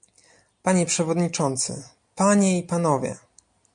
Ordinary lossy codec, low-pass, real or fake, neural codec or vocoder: MP3, 64 kbps; 9.9 kHz; real; none